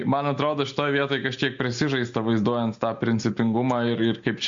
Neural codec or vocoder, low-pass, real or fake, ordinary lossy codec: none; 7.2 kHz; real; MP3, 48 kbps